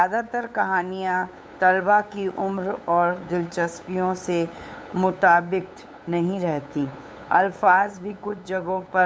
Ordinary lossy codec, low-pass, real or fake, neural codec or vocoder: none; none; fake; codec, 16 kHz, 8 kbps, FunCodec, trained on LibriTTS, 25 frames a second